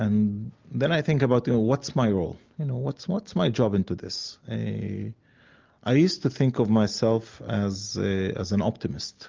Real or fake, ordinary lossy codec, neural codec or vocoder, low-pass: real; Opus, 24 kbps; none; 7.2 kHz